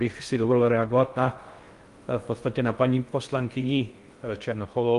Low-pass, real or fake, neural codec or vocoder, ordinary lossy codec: 10.8 kHz; fake; codec, 16 kHz in and 24 kHz out, 0.6 kbps, FocalCodec, streaming, 4096 codes; Opus, 32 kbps